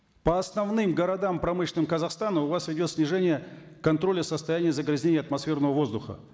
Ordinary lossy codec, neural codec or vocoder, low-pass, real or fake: none; none; none; real